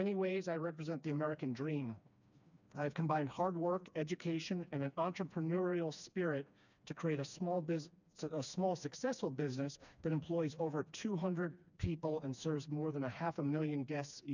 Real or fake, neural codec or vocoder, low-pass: fake; codec, 16 kHz, 2 kbps, FreqCodec, smaller model; 7.2 kHz